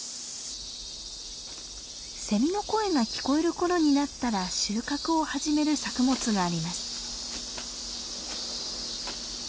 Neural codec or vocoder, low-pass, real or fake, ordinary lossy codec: none; none; real; none